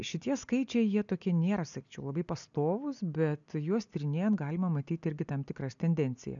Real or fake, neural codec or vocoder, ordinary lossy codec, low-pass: real; none; MP3, 96 kbps; 7.2 kHz